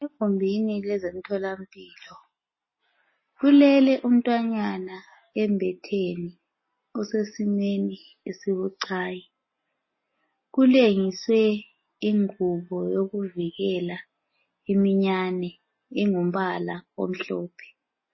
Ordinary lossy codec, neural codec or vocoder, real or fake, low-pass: MP3, 24 kbps; none; real; 7.2 kHz